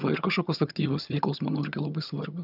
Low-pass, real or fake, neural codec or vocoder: 5.4 kHz; fake; vocoder, 22.05 kHz, 80 mel bands, HiFi-GAN